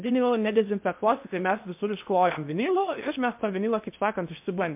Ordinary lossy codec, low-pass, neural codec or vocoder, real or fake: MP3, 32 kbps; 3.6 kHz; codec, 16 kHz in and 24 kHz out, 0.8 kbps, FocalCodec, streaming, 65536 codes; fake